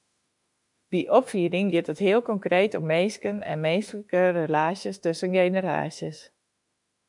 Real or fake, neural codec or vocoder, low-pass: fake; autoencoder, 48 kHz, 32 numbers a frame, DAC-VAE, trained on Japanese speech; 10.8 kHz